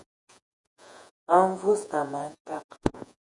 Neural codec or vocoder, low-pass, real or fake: vocoder, 48 kHz, 128 mel bands, Vocos; 10.8 kHz; fake